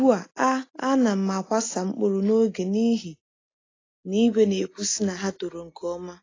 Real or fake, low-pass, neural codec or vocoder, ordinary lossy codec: real; 7.2 kHz; none; AAC, 32 kbps